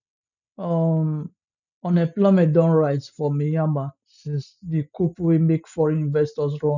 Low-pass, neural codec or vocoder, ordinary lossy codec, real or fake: 7.2 kHz; none; none; real